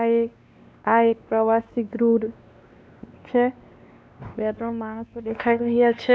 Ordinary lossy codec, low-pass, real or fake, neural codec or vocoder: none; none; fake; codec, 16 kHz, 2 kbps, X-Codec, WavLM features, trained on Multilingual LibriSpeech